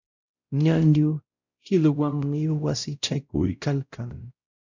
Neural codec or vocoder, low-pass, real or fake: codec, 16 kHz, 0.5 kbps, X-Codec, WavLM features, trained on Multilingual LibriSpeech; 7.2 kHz; fake